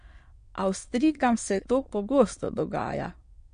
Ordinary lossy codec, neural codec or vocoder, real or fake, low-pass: MP3, 48 kbps; autoencoder, 22.05 kHz, a latent of 192 numbers a frame, VITS, trained on many speakers; fake; 9.9 kHz